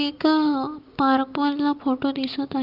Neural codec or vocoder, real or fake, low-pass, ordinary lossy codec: none; real; 5.4 kHz; Opus, 32 kbps